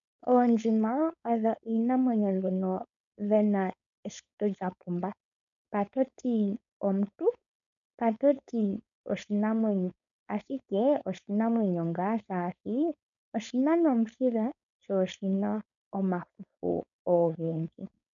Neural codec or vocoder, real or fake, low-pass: codec, 16 kHz, 4.8 kbps, FACodec; fake; 7.2 kHz